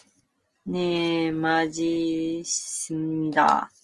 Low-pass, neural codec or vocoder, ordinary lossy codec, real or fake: 10.8 kHz; none; Opus, 24 kbps; real